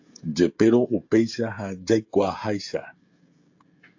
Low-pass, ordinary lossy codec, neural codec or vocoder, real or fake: 7.2 kHz; AAC, 48 kbps; codec, 16 kHz, 16 kbps, FreqCodec, smaller model; fake